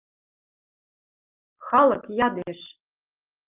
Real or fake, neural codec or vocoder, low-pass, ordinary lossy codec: real; none; 3.6 kHz; Opus, 32 kbps